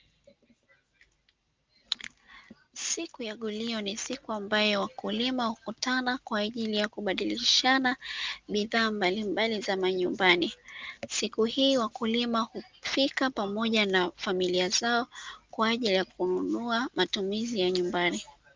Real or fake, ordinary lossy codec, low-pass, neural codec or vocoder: real; Opus, 24 kbps; 7.2 kHz; none